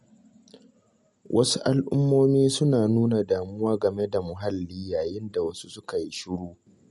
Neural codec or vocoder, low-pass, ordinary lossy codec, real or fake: none; 19.8 kHz; MP3, 48 kbps; real